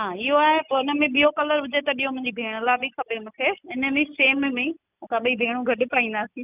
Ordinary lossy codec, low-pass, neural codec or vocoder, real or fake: none; 3.6 kHz; none; real